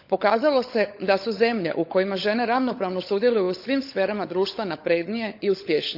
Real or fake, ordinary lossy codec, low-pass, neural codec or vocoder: fake; none; 5.4 kHz; codec, 16 kHz, 16 kbps, FunCodec, trained on LibriTTS, 50 frames a second